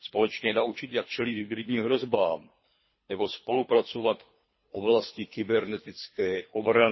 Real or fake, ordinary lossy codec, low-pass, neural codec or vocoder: fake; MP3, 24 kbps; 7.2 kHz; codec, 24 kHz, 3 kbps, HILCodec